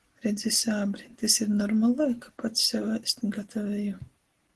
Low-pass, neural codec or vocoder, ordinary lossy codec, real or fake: 10.8 kHz; none; Opus, 16 kbps; real